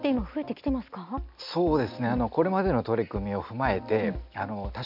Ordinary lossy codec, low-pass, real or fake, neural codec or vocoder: none; 5.4 kHz; real; none